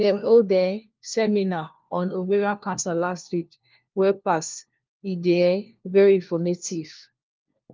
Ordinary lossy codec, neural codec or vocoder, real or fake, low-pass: Opus, 24 kbps; codec, 16 kHz, 1 kbps, FunCodec, trained on LibriTTS, 50 frames a second; fake; 7.2 kHz